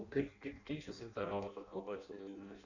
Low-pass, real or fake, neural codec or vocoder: 7.2 kHz; fake; codec, 16 kHz in and 24 kHz out, 0.6 kbps, FireRedTTS-2 codec